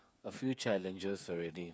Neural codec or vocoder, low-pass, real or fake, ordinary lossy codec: codec, 16 kHz, 8 kbps, FreqCodec, smaller model; none; fake; none